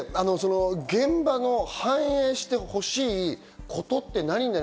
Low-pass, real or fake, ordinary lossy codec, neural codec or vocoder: none; real; none; none